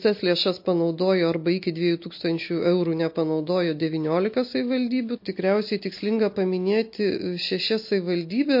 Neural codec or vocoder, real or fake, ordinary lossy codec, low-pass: none; real; MP3, 32 kbps; 5.4 kHz